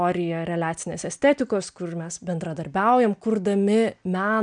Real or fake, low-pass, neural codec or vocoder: real; 9.9 kHz; none